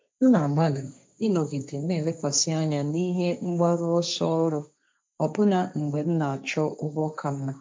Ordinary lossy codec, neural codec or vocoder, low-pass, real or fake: none; codec, 16 kHz, 1.1 kbps, Voila-Tokenizer; none; fake